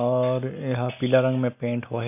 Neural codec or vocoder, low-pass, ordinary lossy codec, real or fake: none; 3.6 kHz; none; real